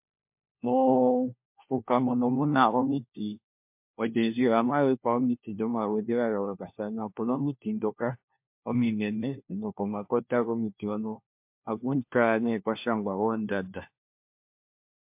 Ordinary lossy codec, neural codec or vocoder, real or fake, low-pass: MP3, 32 kbps; codec, 16 kHz, 1 kbps, FunCodec, trained on LibriTTS, 50 frames a second; fake; 3.6 kHz